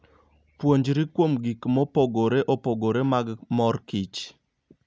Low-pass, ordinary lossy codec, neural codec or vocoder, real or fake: none; none; none; real